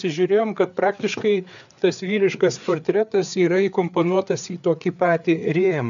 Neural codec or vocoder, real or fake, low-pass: codec, 16 kHz, 4 kbps, FreqCodec, larger model; fake; 7.2 kHz